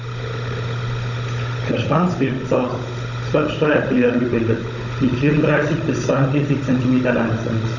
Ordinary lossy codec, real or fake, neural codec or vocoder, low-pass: none; fake; codec, 16 kHz, 16 kbps, FunCodec, trained on Chinese and English, 50 frames a second; 7.2 kHz